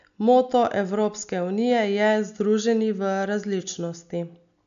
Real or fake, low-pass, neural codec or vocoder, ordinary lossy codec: real; 7.2 kHz; none; none